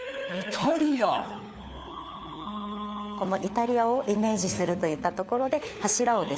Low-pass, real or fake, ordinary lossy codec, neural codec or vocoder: none; fake; none; codec, 16 kHz, 4 kbps, FunCodec, trained on LibriTTS, 50 frames a second